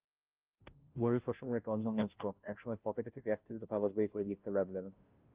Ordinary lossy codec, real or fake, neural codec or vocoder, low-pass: Opus, 24 kbps; fake; codec, 16 kHz, 0.5 kbps, FunCodec, trained on Chinese and English, 25 frames a second; 3.6 kHz